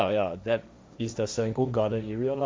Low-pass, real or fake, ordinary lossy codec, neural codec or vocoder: none; fake; none; codec, 16 kHz, 1.1 kbps, Voila-Tokenizer